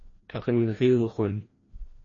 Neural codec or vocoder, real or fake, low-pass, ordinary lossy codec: codec, 16 kHz, 1 kbps, FreqCodec, larger model; fake; 7.2 kHz; MP3, 32 kbps